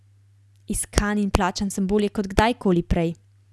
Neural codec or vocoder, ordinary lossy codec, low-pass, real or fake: none; none; none; real